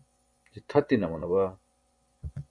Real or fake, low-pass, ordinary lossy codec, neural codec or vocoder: fake; 9.9 kHz; AAC, 64 kbps; vocoder, 24 kHz, 100 mel bands, Vocos